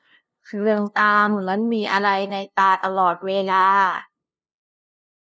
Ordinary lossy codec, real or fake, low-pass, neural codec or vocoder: none; fake; none; codec, 16 kHz, 0.5 kbps, FunCodec, trained on LibriTTS, 25 frames a second